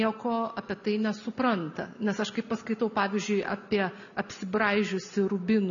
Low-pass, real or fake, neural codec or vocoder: 7.2 kHz; real; none